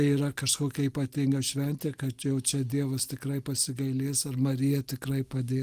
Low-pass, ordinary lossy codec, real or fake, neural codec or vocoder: 14.4 kHz; Opus, 24 kbps; real; none